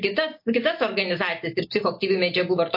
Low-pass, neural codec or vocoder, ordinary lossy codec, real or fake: 5.4 kHz; none; MP3, 32 kbps; real